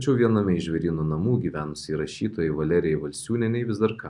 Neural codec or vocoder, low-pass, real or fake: none; 10.8 kHz; real